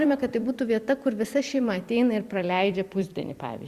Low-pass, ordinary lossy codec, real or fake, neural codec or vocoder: 14.4 kHz; Opus, 64 kbps; fake; vocoder, 44.1 kHz, 128 mel bands every 256 samples, BigVGAN v2